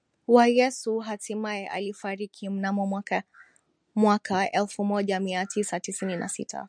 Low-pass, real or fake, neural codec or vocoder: 9.9 kHz; real; none